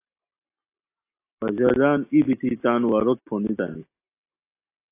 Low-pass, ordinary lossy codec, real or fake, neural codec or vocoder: 3.6 kHz; AAC, 24 kbps; real; none